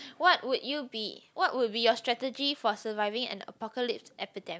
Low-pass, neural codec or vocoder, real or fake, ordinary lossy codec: none; none; real; none